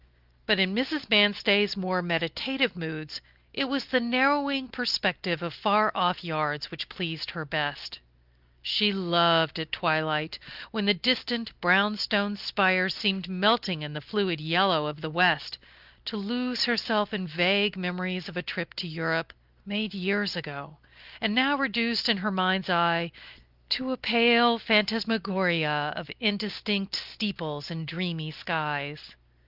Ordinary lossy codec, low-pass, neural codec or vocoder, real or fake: Opus, 24 kbps; 5.4 kHz; none; real